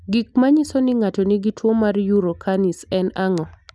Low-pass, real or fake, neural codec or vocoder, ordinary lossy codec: none; real; none; none